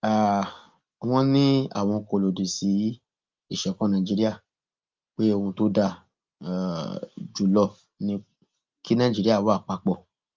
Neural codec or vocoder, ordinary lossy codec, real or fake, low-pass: none; Opus, 24 kbps; real; 7.2 kHz